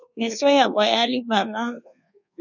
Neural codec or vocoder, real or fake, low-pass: codec, 24 kHz, 1.2 kbps, DualCodec; fake; 7.2 kHz